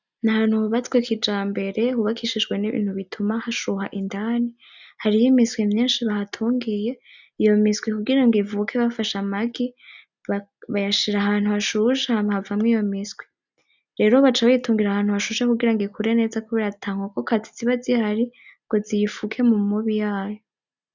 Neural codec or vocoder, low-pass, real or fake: none; 7.2 kHz; real